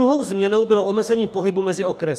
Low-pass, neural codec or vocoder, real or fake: 14.4 kHz; codec, 44.1 kHz, 2.6 kbps, DAC; fake